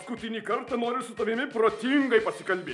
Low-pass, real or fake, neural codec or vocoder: 10.8 kHz; real; none